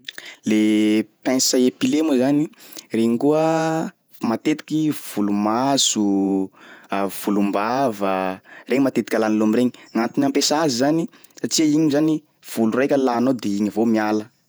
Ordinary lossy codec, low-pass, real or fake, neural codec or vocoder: none; none; fake; vocoder, 48 kHz, 128 mel bands, Vocos